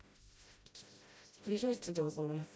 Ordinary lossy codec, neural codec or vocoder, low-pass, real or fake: none; codec, 16 kHz, 0.5 kbps, FreqCodec, smaller model; none; fake